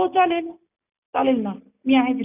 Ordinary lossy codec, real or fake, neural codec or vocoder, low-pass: none; real; none; 3.6 kHz